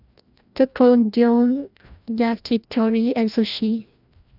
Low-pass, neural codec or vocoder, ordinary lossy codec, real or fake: 5.4 kHz; codec, 16 kHz, 1 kbps, FreqCodec, larger model; none; fake